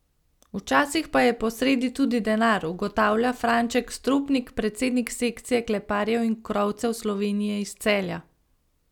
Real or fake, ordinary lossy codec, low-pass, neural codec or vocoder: real; none; 19.8 kHz; none